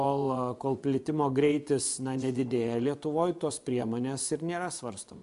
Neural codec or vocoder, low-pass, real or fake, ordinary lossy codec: vocoder, 24 kHz, 100 mel bands, Vocos; 10.8 kHz; fake; MP3, 96 kbps